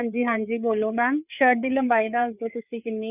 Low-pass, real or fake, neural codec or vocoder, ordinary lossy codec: 3.6 kHz; fake; codec, 16 kHz, 4 kbps, FreqCodec, larger model; none